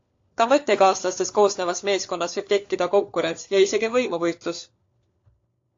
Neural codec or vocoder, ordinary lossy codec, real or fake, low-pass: codec, 16 kHz, 4 kbps, FunCodec, trained on LibriTTS, 50 frames a second; AAC, 48 kbps; fake; 7.2 kHz